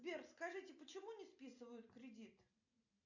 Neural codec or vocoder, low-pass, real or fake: none; 7.2 kHz; real